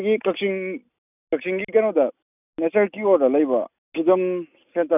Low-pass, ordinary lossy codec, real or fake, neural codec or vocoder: 3.6 kHz; none; real; none